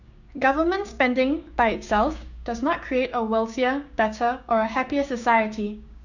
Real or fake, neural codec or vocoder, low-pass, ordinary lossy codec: fake; codec, 16 kHz, 6 kbps, DAC; 7.2 kHz; none